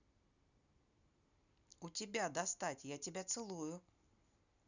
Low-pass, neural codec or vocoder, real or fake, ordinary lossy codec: 7.2 kHz; none; real; none